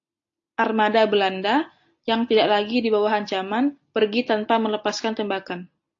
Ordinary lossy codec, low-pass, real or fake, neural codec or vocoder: AAC, 48 kbps; 7.2 kHz; real; none